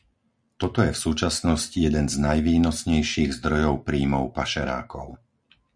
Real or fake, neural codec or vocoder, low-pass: real; none; 9.9 kHz